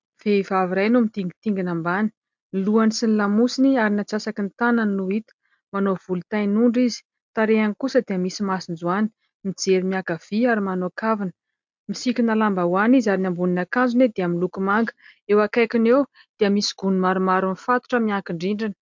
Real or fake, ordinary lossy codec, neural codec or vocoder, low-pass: real; MP3, 64 kbps; none; 7.2 kHz